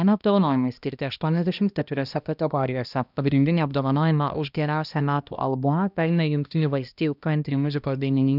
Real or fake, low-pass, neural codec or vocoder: fake; 5.4 kHz; codec, 16 kHz, 1 kbps, X-Codec, HuBERT features, trained on balanced general audio